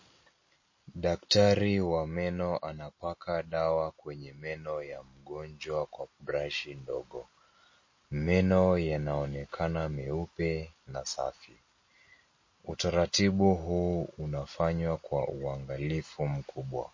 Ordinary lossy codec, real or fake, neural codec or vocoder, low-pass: MP3, 32 kbps; real; none; 7.2 kHz